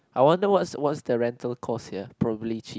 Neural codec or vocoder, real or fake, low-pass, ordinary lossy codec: none; real; none; none